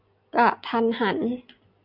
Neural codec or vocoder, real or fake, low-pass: none; real; 5.4 kHz